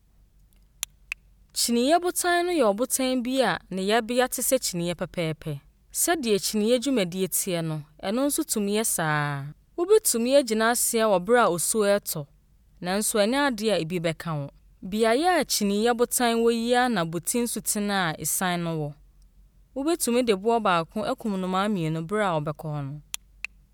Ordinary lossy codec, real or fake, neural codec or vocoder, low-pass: none; real; none; none